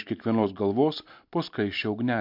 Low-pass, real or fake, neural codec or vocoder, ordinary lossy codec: 5.4 kHz; real; none; MP3, 48 kbps